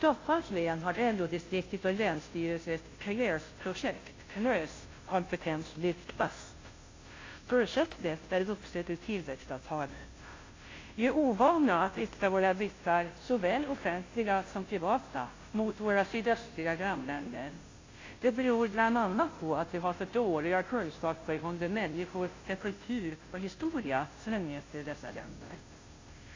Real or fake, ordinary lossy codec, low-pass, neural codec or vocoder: fake; AAC, 32 kbps; 7.2 kHz; codec, 16 kHz, 0.5 kbps, FunCodec, trained on Chinese and English, 25 frames a second